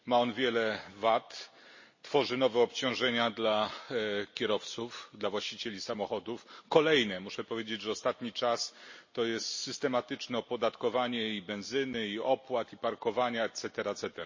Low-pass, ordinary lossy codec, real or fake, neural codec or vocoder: 7.2 kHz; MP3, 32 kbps; real; none